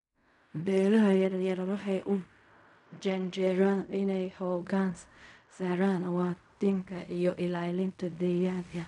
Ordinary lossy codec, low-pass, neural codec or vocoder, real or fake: none; 10.8 kHz; codec, 16 kHz in and 24 kHz out, 0.4 kbps, LongCat-Audio-Codec, fine tuned four codebook decoder; fake